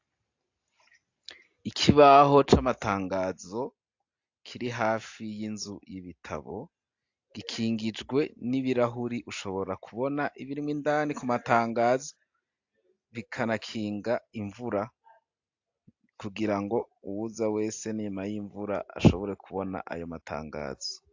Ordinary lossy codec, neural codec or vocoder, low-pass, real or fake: AAC, 48 kbps; none; 7.2 kHz; real